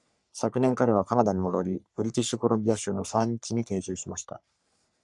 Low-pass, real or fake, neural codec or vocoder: 10.8 kHz; fake; codec, 44.1 kHz, 3.4 kbps, Pupu-Codec